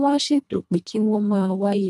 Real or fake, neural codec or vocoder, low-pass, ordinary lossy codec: fake; codec, 24 kHz, 1.5 kbps, HILCodec; none; none